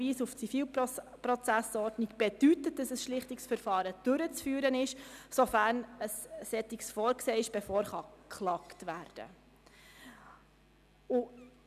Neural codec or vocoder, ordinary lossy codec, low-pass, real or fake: none; none; 14.4 kHz; real